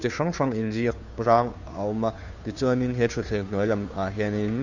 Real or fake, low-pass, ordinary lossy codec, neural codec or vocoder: fake; 7.2 kHz; none; codec, 16 kHz, 2 kbps, FunCodec, trained on Chinese and English, 25 frames a second